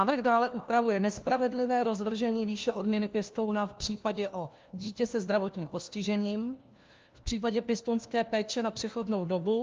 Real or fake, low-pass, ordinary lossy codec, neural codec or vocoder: fake; 7.2 kHz; Opus, 24 kbps; codec, 16 kHz, 1 kbps, FunCodec, trained on LibriTTS, 50 frames a second